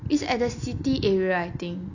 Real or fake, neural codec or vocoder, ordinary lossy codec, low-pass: real; none; none; 7.2 kHz